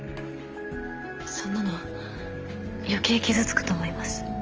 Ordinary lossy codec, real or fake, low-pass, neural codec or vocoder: Opus, 24 kbps; real; 7.2 kHz; none